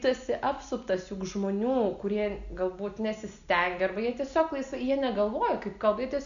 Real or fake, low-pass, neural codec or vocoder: real; 7.2 kHz; none